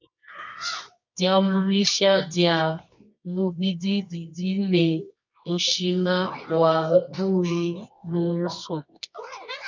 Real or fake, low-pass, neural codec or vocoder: fake; 7.2 kHz; codec, 24 kHz, 0.9 kbps, WavTokenizer, medium music audio release